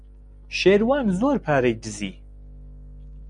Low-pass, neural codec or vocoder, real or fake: 9.9 kHz; none; real